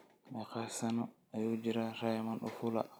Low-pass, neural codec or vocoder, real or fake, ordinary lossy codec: none; none; real; none